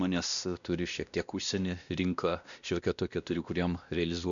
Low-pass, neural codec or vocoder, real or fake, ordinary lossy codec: 7.2 kHz; codec, 16 kHz, 1 kbps, X-Codec, WavLM features, trained on Multilingual LibriSpeech; fake; MP3, 96 kbps